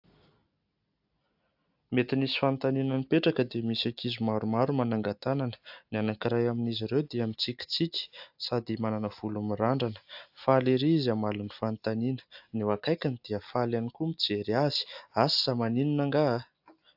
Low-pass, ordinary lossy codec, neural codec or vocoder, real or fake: 5.4 kHz; AAC, 48 kbps; none; real